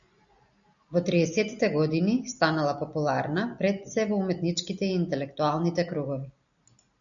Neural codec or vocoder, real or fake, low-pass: none; real; 7.2 kHz